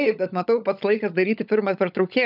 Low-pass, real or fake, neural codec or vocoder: 5.4 kHz; fake; codec, 16 kHz, 16 kbps, FunCodec, trained on LibriTTS, 50 frames a second